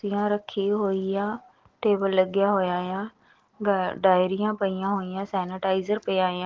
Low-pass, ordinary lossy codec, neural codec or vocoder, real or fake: 7.2 kHz; Opus, 16 kbps; none; real